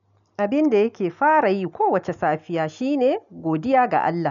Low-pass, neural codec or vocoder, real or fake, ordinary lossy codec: 7.2 kHz; none; real; none